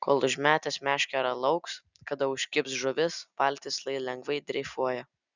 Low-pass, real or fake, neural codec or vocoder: 7.2 kHz; real; none